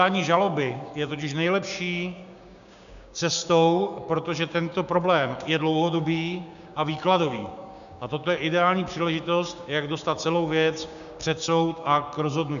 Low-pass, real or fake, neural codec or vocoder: 7.2 kHz; fake; codec, 16 kHz, 6 kbps, DAC